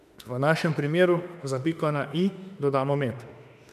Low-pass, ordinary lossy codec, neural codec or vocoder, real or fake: 14.4 kHz; none; autoencoder, 48 kHz, 32 numbers a frame, DAC-VAE, trained on Japanese speech; fake